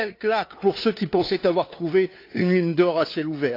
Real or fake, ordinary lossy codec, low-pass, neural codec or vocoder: fake; AAC, 32 kbps; 5.4 kHz; codec, 16 kHz, 2 kbps, FunCodec, trained on LibriTTS, 25 frames a second